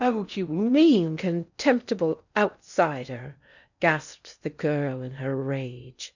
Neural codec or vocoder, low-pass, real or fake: codec, 16 kHz in and 24 kHz out, 0.6 kbps, FocalCodec, streaming, 4096 codes; 7.2 kHz; fake